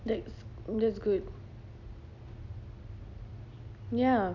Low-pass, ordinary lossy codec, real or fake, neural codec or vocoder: 7.2 kHz; none; real; none